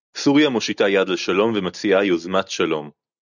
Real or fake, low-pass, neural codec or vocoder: real; 7.2 kHz; none